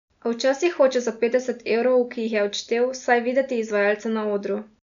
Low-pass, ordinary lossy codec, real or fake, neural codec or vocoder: 7.2 kHz; none; real; none